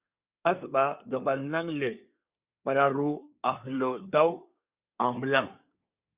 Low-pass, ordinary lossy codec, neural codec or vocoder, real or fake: 3.6 kHz; Opus, 64 kbps; codec, 24 kHz, 1 kbps, SNAC; fake